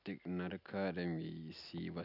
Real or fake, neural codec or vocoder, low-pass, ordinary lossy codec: real; none; 5.4 kHz; none